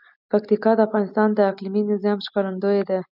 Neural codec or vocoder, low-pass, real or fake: none; 5.4 kHz; real